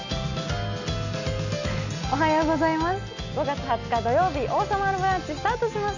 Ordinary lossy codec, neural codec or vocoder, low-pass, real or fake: none; none; 7.2 kHz; real